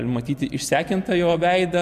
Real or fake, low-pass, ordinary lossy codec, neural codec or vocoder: real; 14.4 kHz; MP3, 96 kbps; none